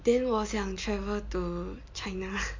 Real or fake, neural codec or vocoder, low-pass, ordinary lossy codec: real; none; 7.2 kHz; MP3, 48 kbps